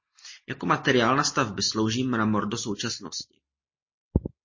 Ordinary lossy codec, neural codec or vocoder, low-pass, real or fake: MP3, 32 kbps; none; 7.2 kHz; real